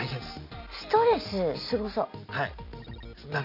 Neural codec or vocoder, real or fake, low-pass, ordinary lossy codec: none; real; 5.4 kHz; none